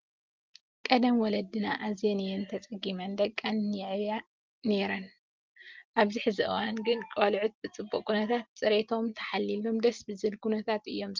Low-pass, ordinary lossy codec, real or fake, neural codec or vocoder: 7.2 kHz; Opus, 32 kbps; real; none